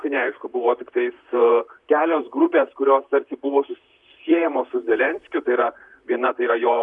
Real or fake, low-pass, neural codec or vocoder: fake; 10.8 kHz; vocoder, 44.1 kHz, 128 mel bands, Pupu-Vocoder